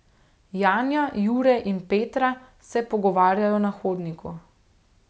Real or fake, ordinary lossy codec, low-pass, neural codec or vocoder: real; none; none; none